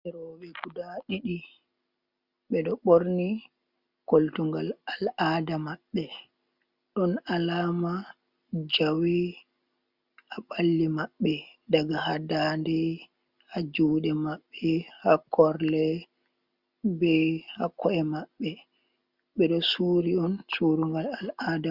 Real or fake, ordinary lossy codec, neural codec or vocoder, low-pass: real; Opus, 64 kbps; none; 5.4 kHz